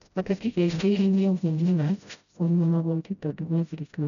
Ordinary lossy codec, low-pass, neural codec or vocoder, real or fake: none; 7.2 kHz; codec, 16 kHz, 0.5 kbps, FreqCodec, smaller model; fake